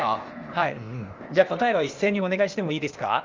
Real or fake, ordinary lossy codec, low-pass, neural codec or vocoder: fake; Opus, 32 kbps; 7.2 kHz; codec, 16 kHz, 0.8 kbps, ZipCodec